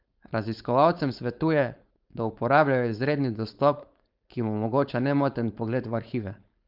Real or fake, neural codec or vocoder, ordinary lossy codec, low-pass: fake; codec, 16 kHz, 4.8 kbps, FACodec; Opus, 32 kbps; 5.4 kHz